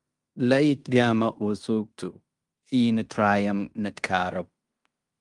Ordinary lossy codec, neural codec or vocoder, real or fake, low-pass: Opus, 32 kbps; codec, 16 kHz in and 24 kHz out, 0.9 kbps, LongCat-Audio-Codec, fine tuned four codebook decoder; fake; 10.8 kHz